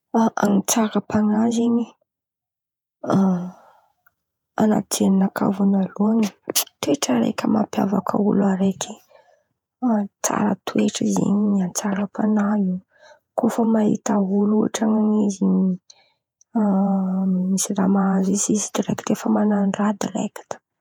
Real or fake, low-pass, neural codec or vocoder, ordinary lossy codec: fake; 19.8 kHz; vocoder, 48 kHz, 128 mel bands, Vocos; none